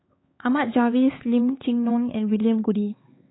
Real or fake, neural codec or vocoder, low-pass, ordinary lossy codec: fake; codec, 16 kHz, 4 kbps, X-Codec, HuBERT features, trained on LibriSpeech; 7.2 kHz; AAC, 16 kbps